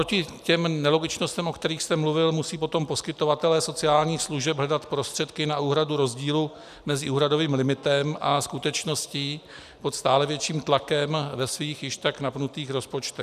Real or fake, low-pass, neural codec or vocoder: real; 14.4 kHz; none